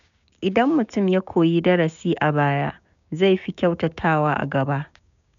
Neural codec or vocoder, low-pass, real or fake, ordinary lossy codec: codec, 16 kHz, 6 kbps, DAC; 7.2 kHz; fake; none